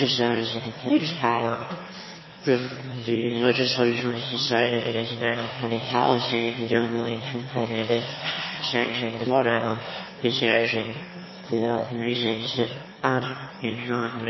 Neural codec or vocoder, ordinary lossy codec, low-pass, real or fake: autoencoder, 22.05 kHz, a latent of 192 numbers a frame, VITS, trained on one speaker; MP3, 24 kbps; 7.2 kHz; fake